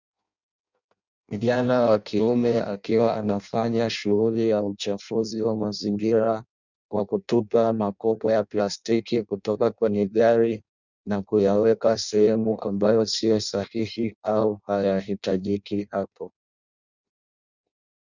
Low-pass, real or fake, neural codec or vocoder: 7.2 kHz; fake; codec, 16 kHz in and 24 kHz out, 0.6 kbps, FireRedTTS-2 codec